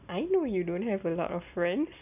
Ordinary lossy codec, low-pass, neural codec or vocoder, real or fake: none; 3.6 kHz; none; real